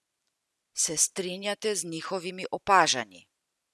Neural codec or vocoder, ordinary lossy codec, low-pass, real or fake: none; none; none; real